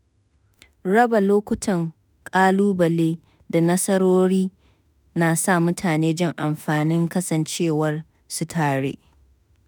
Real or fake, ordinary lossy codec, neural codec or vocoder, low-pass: fake; none; autoencoder, 48 kHz, 32 numbers a frame, DAC-VAE, trained on Japanese speech; none